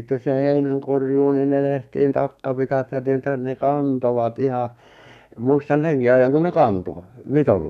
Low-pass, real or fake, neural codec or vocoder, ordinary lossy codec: 14.4 kHz; fake; codec, 32 kHz, 1.9 kbps, SNAC; none